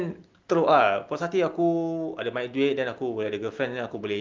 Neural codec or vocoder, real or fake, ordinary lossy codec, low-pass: none; real; Opus, 32 kbps; 7.2 kHz